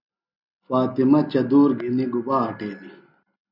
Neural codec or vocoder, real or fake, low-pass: none; real; 5.4 kHz